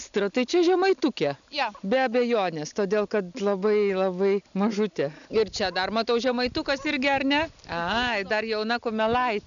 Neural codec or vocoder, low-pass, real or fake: none; 7.2 kHz; real